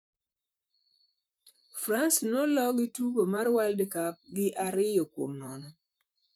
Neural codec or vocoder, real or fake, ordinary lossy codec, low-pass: vocoder, 44.1 kHz, 128 mel bands, Pupu-Vocoder; fake; none; none